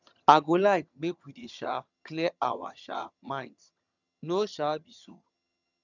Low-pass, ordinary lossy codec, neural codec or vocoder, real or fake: 7.2 kHz; none; vocoder, 22.05 kHz, 80 mel bands, HiFi-GAN; fake